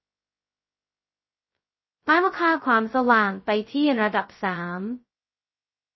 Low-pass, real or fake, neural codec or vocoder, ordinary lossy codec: 7.2 kHz; fake; codec, 16 kHz, 0.2 kbps, FocalCodec; MP3, 24 kbps